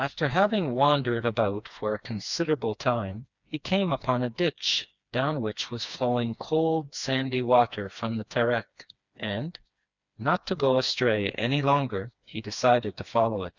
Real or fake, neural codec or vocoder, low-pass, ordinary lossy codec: fake; codec, 16 kHz, 2 kbps, FreqCodec, smaller model; 7.2 kHz; Opus, 64 kbps